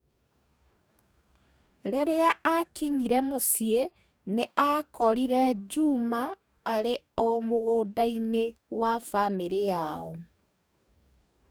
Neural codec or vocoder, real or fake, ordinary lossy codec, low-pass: codec, 44.1 kHz, 2.6 kbps, DAC; fake; none; none